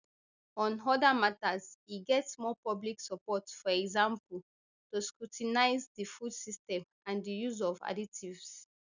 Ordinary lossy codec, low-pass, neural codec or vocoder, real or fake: none; 7.2 kHz; none; real